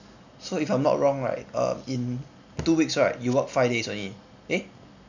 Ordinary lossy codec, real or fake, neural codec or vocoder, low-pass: none; real; none; 7.2 kHz